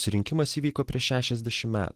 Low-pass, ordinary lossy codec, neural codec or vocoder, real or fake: 14.4 kHz; Opus, 16 kbps; none; real